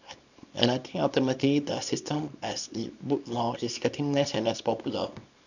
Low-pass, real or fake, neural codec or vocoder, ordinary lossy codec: 7.2 kHz; fake; codec, 24 kHz, 0.9 kbps, WavTokenizer, small release; none